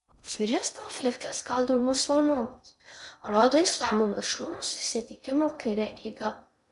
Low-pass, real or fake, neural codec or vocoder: 10.8 kHz; fake; codec, 16 kHz in and 24 kHz out, 0.6 kbps, FocalCodec, streaming, 2048 codes